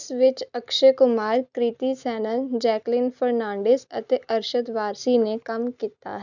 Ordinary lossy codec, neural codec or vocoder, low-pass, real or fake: none; none; 7.2 kHz; real